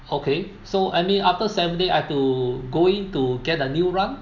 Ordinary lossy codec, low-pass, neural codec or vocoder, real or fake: none; 7.2 kHz; none; real